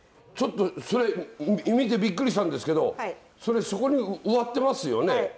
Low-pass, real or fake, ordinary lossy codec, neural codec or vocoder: none; real; none; none